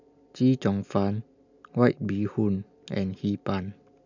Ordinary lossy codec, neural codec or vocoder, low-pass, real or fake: none; none; 7.2 kHz; real